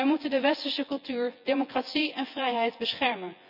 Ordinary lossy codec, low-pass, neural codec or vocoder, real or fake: none; 5.4 kHz; vocoder, 24 kHz, 100 mel bands, Vocos; fake